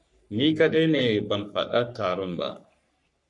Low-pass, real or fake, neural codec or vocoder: 10.8 kHz; fake; codec, 44.1 kHz, 3.4 kbps, Pupu-Codec